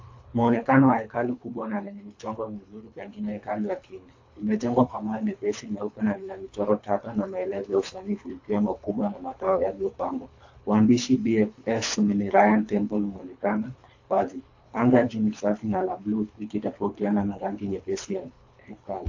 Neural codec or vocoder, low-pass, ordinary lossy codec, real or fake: codec, 24 kHz, 3 kbps, HILCodec; 7.2 kHz; AAC, 48 kbps; fake